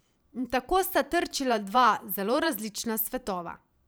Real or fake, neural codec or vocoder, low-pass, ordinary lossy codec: fake; vocoder, 44.1 kHz, 128 mel bands every 512 samples, BigVGAN v2; none; none